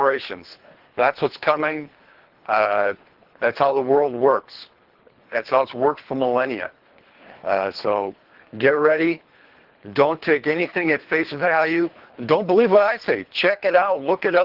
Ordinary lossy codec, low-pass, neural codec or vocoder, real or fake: Opus, 16 kbps; 5.4 kHz; codec, 24 kHz, 3 kbps, HILCodec; fake